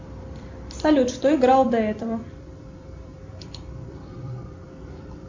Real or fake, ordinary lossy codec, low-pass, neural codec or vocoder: fake; MP3, 64 kbps; 7.2 kHz; vocoder, 44.1 kHz, 128 mel bands every 256 samples, BigVGAN v2